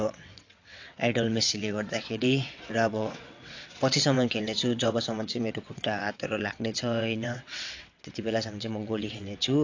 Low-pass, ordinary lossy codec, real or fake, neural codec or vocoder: 7.2 kHz; AAC, 48 kbps; fake; vocoder, 22.05 kHz, 80 mel bands, WaveNeXt